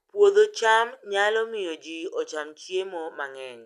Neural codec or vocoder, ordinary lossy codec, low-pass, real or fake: none; none; 14.4 kHz; real